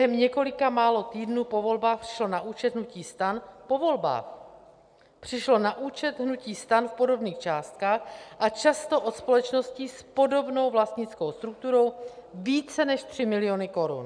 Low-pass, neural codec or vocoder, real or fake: 9.9 kHz; none; real